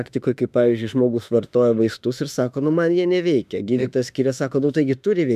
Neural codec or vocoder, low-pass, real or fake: autoencoder, 48 kHz, 32 numbers a frame, DAC-VAE, trained on Japanese speech; 14.4 kHz; fake